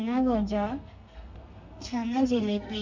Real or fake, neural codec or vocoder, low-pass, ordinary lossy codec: fake; codec, 32 kHz, 1.9 kbps, SNAC; 7.2 kHz; MP3, 48 kbps